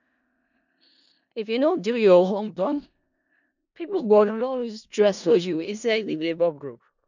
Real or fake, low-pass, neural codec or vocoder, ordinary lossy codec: fake; 7.2 kHz; codec, 16 kHz in and 24 kHz out, 0.4 kbps, LongCat-Audio-Codec, four codebook decoder; none